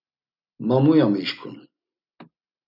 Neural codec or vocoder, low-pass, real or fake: none; 5.4 kHz; real